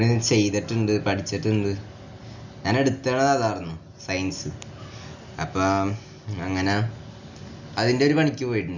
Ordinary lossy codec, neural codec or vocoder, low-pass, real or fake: none; none; 7.2 kHz; real